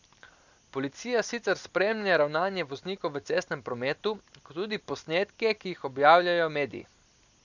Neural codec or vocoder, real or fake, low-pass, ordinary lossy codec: none; real; 7.2 kHz; none